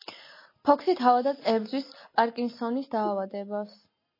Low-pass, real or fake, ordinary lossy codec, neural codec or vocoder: 5.4 kHz; real; MP3, 24 kbps; none